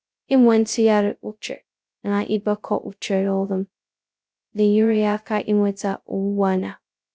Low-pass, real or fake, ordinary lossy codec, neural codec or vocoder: none; fake; none; codec, 16 kHz, 0.2 kbps, FocalCodec